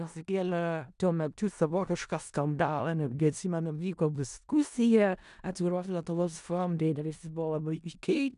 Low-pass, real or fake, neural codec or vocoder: 10.8 kHz; fake; codec, 16 kHz in and 24 kHz out, 0.4 kbps, LongCat-Audio-Codec, four codebook decoder